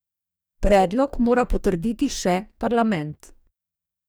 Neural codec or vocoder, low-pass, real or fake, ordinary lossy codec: codec, 44.1 kHz, 2.6 kbps, DAC; none; fake; none